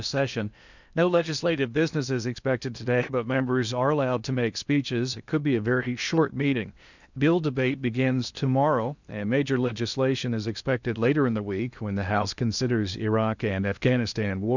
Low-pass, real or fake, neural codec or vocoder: 7.2 kHz; fake; codec, 16 kHz in and 24 kHz out, 0.8 kbps, FocalCodec, streaming, 65536 codes